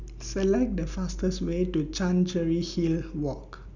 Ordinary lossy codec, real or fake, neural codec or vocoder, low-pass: none; real; none; 7.2 kHz